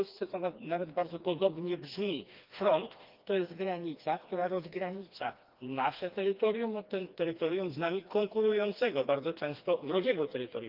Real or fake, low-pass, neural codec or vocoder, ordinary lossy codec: fake; 5.4 kHz; codec, 16 kHz, 2 kbps, FreqCodec, smaller model; Opus, 24 kbps